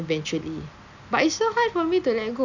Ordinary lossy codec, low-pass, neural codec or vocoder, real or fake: none; 7.2 kHz; none; real